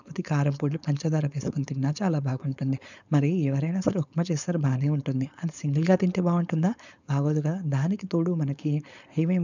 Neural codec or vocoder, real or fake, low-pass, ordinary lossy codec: codec, 16 kHz, 4.8 kbps, FACodec; fake; 7.2 kHz; none